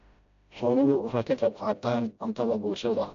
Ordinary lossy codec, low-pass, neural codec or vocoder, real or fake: AAC, 64 kbps; 7.2 kHz; codec, 16 kHz, 0.5 kbps, FreqCodec, smaller model; fake